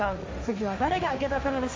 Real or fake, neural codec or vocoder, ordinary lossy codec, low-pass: fake; codec, 16 kHz, 1.1 kbps, Voila-Tokenizer; none; none